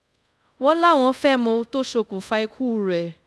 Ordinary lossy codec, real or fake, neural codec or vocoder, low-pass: none; fake; codec, 24 kHz, 0.5 kbps, DualCodec; none